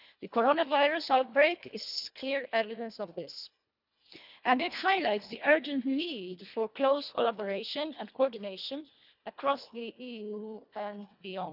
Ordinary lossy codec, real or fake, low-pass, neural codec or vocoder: none; fake; 5.4 kHz; codec, 24 kHz, 1.5 kbps, HILCodec